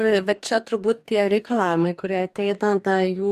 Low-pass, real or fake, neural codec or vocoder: 14.4 kHz; fake; codec, 44.1 kHz, 2.6 kbps, DAC